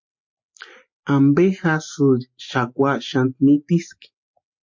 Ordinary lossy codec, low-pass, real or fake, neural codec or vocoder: MP3, 48 kbps; 7.2 kHz; real; none